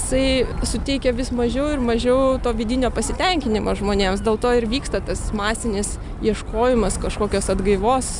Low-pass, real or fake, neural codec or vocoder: 10.8 kHz; real; none